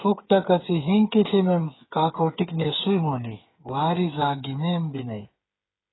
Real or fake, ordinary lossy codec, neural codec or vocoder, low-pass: fake; AAC, 16 kbps; codec, 16 kHz, 8 kbps, FreqCodec, smaller model; 7.2 kHz